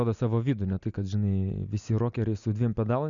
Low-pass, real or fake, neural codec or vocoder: 7.2 kHz; real; none